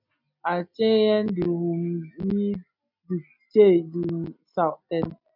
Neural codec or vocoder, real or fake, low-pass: none; real; 5.4 kHz